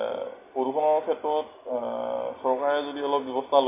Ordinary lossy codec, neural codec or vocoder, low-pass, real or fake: AAC, 24 kbps; none; 3.6 kHz; real